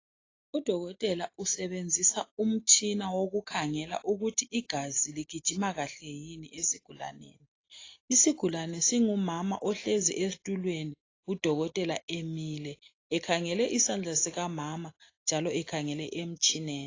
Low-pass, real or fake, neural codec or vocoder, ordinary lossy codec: 7.2 kHz; real; none; AAC, 32 kbps